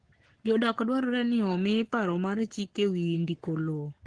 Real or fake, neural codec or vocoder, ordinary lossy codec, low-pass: fake; codec, 44.1 kHz, 7.8 kbps, Pupu-Codec; Opus, 16 kbps; 9.9 kHz